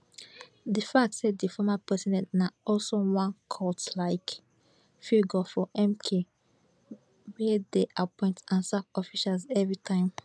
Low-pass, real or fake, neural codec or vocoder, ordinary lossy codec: none; real; none; none